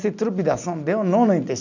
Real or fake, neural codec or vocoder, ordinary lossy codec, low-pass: real; none; none; 7.2 kHz